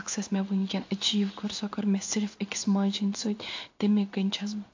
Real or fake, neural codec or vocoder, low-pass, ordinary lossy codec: fake; codec, 16 kHz in and 24 kHz out, 1 kbps, XY-Tokenizer; 7.2 kHz; AAC, 48 kbps